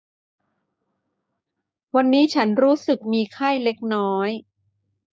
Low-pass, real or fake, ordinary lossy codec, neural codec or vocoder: none; fake; none; codec, 16 kHz, 6 kbps, DAC